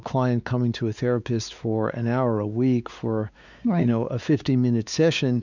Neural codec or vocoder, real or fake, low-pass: codec, 16 kHz, 4 kbps, X-Codec, WavLM features, trained on Multilingual LibriSpeech; fake; 7.2 kHz